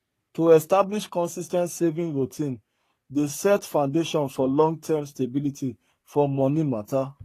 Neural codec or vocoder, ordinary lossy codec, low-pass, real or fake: codec, 44.1 kHz, 3.4 kbps, Pupu-Codec; AAC, 48 kbps; 14.4 kHz; fake